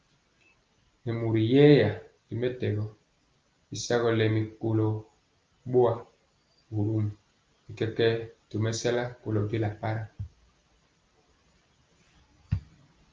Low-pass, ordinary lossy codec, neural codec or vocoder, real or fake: 7.2 kHz; Opus, 32 kbps; none; real